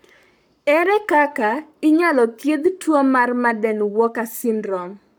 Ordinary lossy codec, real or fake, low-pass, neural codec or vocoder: none; fake; none; codec, 44.1 kHz, 7.8 kbps, Pupu-Codec